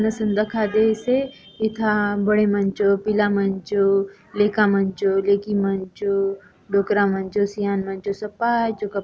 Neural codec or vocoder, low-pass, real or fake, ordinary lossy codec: none; none; real; none